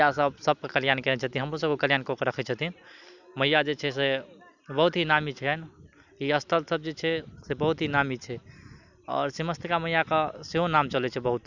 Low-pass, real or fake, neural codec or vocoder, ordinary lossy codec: 7.2 kHz; real; none; none